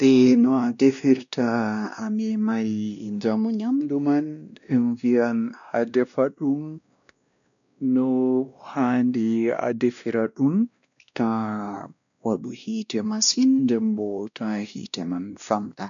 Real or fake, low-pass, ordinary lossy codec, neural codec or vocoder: fake; 7.2 kHz; none; codec, 16 kHz, 1 kbps, X-Codec, WavLM features, trained on Multilingual LibriSpeech